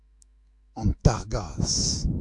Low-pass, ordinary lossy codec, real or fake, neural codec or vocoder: 10.8 kHz; AAC, 64 kbps; fake; autoencoder, 48 kHz, 128 numbers a frame, DAC-VAE, trained on Japanese speech